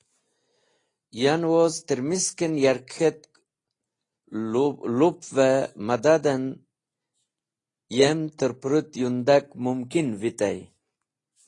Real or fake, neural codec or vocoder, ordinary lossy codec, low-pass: real; none; AAC, 32 kbps; 10.8 kHz